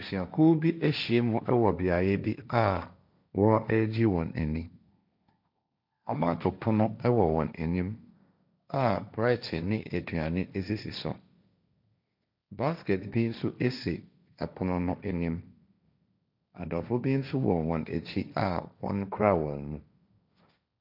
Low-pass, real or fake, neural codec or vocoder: 5.4 kHz; fake; codec, 16 kHz, 1.1 kbps, Voila-Tokenizer